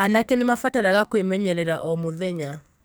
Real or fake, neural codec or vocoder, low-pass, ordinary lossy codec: fake; codec, 44.1 kHz, 2.6 kbps, SNAC; none; none